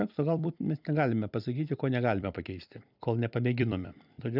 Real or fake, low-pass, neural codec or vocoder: fake; 5.4 kHz; vocoder, 44.1 kHz, 128 mel bands every 256 samples, BigVGAN v2